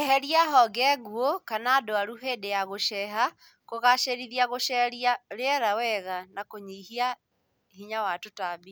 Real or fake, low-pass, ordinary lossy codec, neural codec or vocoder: real; none; none; none